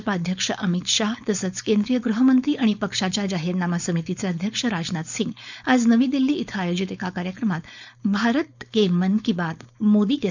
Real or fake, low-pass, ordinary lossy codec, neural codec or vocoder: fake; 7.2 kHz; none; codec, 16 kHz, 4.8 kbps, FACodec